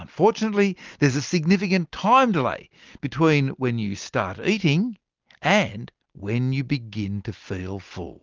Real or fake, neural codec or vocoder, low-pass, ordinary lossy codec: real; none; 7.2 kHz; Opus, 24 kbps